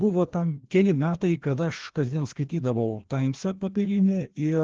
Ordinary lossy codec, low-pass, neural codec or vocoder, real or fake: Opus, 16 kbps; 7.2 kHz; codec, 16 kHz, 1 kbps, FreqCodec, larger model; fake